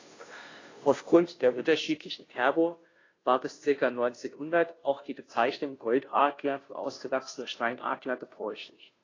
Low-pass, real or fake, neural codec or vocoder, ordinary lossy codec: 7.2 kHz; fake; codec, 16 kHz, 0.5 kbps, FunCodec, trained on Chinese and English, 25 frames a second; AAC, 32 kbps